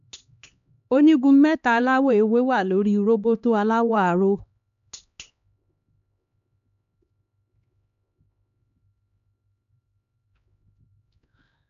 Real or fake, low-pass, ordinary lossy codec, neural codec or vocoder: fake; 7.2 kHz; AAC, 96 kbps; codec, 16 kHz, 2 kbps, X-Codec, HuBERT features, trained on LibriSpeech